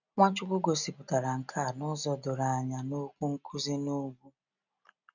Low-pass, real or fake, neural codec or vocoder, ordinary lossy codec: 7.2 kHz; real; none; none